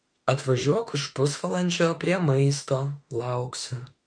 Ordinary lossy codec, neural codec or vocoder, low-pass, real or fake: MP3, 48 kbps; autoencoder, 48 kHz, 32 numbers a frame, DAC-VAE, trained on Japanese speech; 9.9 kHz; fake